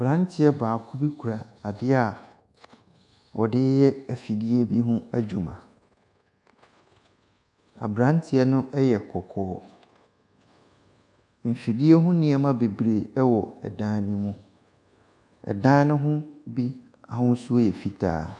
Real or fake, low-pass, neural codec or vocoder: fake; 10.8 kHz; codec, 24 kHz, 1.2 kbps, DualCodec